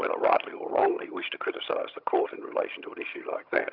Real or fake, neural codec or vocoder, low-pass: fake; vocoder, 22.05 kHz, 80 mel bands, HiFi-GAN; 5.4 kHz